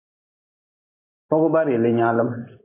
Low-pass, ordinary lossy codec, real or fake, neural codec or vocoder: 3.6 kHz; AAC, 16 kbps; real; none